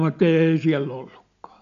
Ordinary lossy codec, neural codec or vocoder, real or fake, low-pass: none; none; real; 7.2 kHz